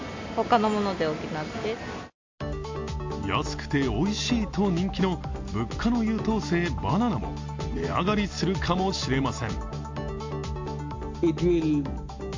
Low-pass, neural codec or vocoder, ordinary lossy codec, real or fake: 7.2 kHz; none; MP3, 48 kbps; real